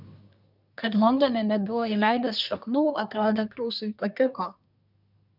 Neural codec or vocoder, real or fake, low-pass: codec, 24 kHz, 1 kbps, SNAC; fake; 5.4 kHz